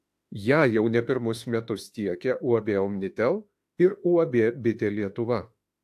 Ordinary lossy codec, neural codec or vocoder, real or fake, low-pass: AAC, 64 kbps; autoencoder, 48 kHz, 32 numbers a frame, DAC-VAE, trained on Japanese speech; fake; 14.4 kHz